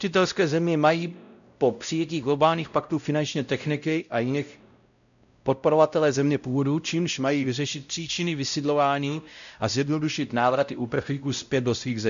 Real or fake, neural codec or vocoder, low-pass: fake; codec, 16 kHz, 0.5 kbps, X-Codec, WavLM features, trained on Multilingual LibriSpeech; 7.2 kHz